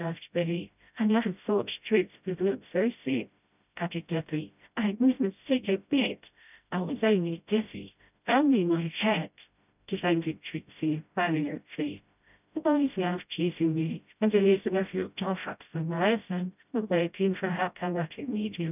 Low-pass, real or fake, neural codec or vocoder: 3.6 kHz; fake; codec, 16 kHz, 0.5 kbps, FreqCodec, smaller model